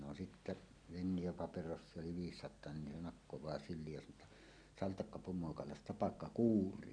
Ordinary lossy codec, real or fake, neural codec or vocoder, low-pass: none; real; none; 9.9 kHz